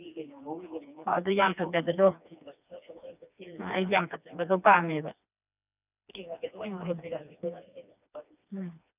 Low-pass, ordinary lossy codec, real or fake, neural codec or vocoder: 3.6 kHz; none; fake; codec, 16 kHz, 2 kbps, FreqCodec, smaller model